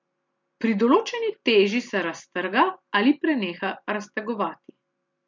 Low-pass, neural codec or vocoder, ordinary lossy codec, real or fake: 7.2 kHz; none; MP3, 48 kbps; real